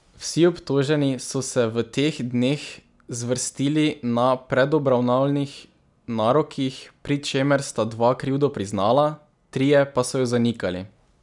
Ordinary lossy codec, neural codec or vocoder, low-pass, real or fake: none; none; 10.8 kHz; real